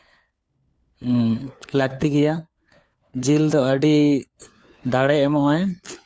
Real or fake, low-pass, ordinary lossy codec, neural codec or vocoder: fake; none; none; codec, 16 kHz, 4 kbps, FunCodec, trained on LibriTTS, 50 frames a second